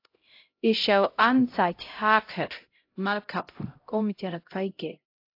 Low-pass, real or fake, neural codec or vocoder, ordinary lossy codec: 5.4 kHz; fake; codec, 16 kHz, 0.5 kbps, X-Codec, HuBERT features, trained on LibriSpeech; AAC, 32 kbps